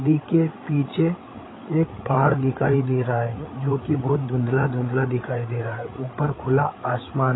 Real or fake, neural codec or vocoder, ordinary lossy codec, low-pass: fake; codec, 16 kHz, 8 kbps, FreqCodec, larger model; AAC, 16 kbps; 7.2 kHz